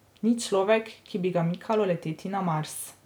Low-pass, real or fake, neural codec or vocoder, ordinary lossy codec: none; real; none; none